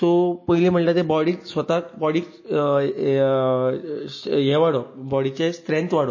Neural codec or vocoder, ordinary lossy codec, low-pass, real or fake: none; MP3, 32 kbps; 7.2 kHz; real